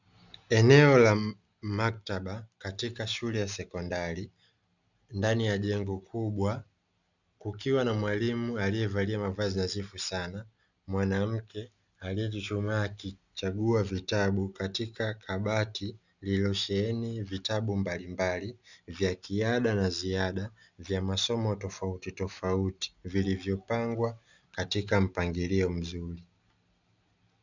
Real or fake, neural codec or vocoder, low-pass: real; none; 7.2 kHz